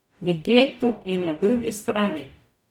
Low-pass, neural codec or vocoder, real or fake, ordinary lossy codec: 19.8 kHz; codec, 44.1 kHz, 0.9 kbps, DAC; fake; none